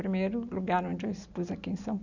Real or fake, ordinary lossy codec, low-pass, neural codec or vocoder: real; none; 7.2 kHz; none